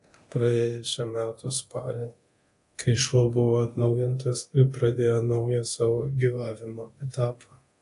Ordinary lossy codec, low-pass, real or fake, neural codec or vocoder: AAC, 48 kbps; 10.8 kHz; fake; codec, 24 kHz, 0.9 kbps, DualCodec